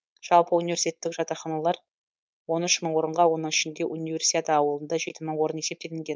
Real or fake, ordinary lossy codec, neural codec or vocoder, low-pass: fake; none; codec, 16 kHz, 4.8 kbps, FACodec; none